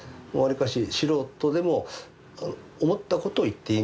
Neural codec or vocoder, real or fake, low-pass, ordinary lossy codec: none; real; none; none